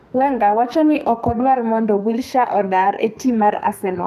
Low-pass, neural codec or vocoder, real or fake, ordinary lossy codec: 14.4 kHz; codec, 32 kHz, 1.9 kbps, SNAC; fake; none